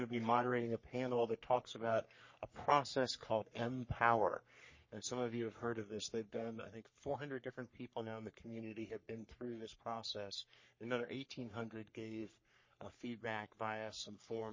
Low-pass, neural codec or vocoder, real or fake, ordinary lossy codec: 7.2 kHz; codec, 44.1 kHz, 3.4 kbps, Pupu-Codec; fake; MP3, 32 kbps